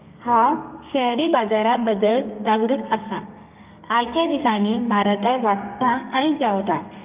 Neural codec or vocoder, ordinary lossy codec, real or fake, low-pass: codec, 32 kHz, 1.9 kbps, SNAC; Opus, 24 kbps; fake; 3.6 kHz